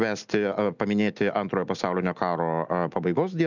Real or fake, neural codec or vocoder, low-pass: real; none; 7.2 kHz